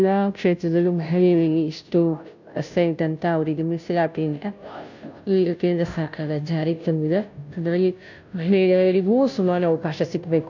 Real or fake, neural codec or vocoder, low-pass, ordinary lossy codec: fake; codec, 16 kHz, 0.5 kbps, FunCodec, trained on Chinese and English, 25 frames a second; 7.2 kHz; none